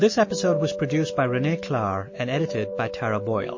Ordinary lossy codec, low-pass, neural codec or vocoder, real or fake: MP3, 32 kbps; 7.2 kHz; autoencoder, 48 kHz, 128 numbers a frame, DAC-VAE, trained on Japanese speech; fake